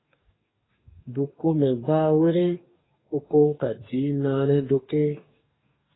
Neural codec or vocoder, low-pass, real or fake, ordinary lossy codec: codec, 44.1 kHz, 2.6 kbps, DAC; 7.2 kHz; fake; AAC, 16 kbps